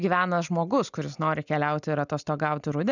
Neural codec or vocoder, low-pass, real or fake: none; 7.2 kHz; real